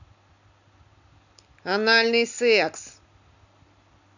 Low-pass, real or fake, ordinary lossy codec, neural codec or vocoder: 7.2 kHz; real; none; none